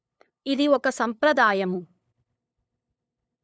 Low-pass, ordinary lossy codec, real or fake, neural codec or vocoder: none; none; fake; codec, 16 kHz, 8 kbps, FunCodec, trained on LibriTTS, 25 frames a second